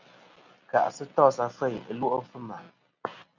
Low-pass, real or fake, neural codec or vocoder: 7.2 kHz; real; none